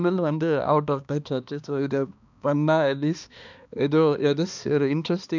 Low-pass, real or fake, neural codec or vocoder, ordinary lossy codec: 7.2 kHz; fake; codec, 16 kHz, 2 kbps, X-Codec, HuBERT features, trained on balanced general audio; none